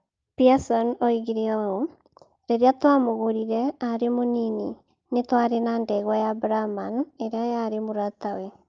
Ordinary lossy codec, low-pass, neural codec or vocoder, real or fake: Opus, 24 kbps; 7.2 kHz; none; real